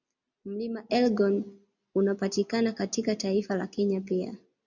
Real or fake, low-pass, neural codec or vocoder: real; 7.2 kHz; none